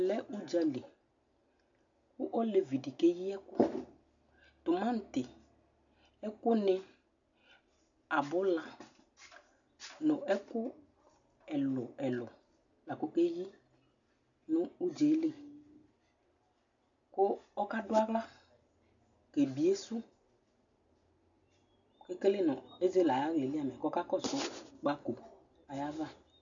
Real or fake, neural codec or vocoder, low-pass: real; none; 7.2 kHz